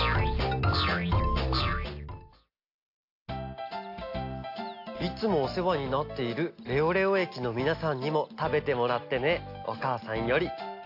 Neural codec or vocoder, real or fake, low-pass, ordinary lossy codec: none; real; 5.4 kHz; AAC, 32 kbps